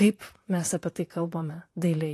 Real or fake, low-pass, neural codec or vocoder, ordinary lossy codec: fake; 14.4 kHz; vocoder, 44.1 kHz, 128 mel bands, Pupu-Vocoder; AAC, 48 kbps